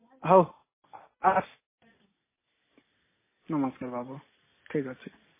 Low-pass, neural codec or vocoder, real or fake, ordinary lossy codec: 3.6 kHz; none; real; MP3, 16 kbps